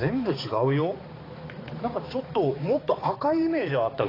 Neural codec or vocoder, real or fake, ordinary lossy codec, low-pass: codec, 16 kHz, 4 kbps, X-Codec, HuBERT features, trained on balanced general audio; fake; AAC, 24 kbps; 5.4 kHz